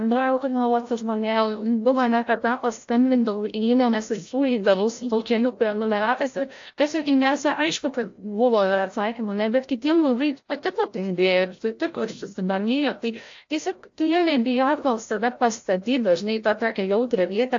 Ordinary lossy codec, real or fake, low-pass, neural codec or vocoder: AAC, 48 kbps; fake; 7.2 kHz; codec, 16 kHz, 0.5 kbps, FreqCodec, larger model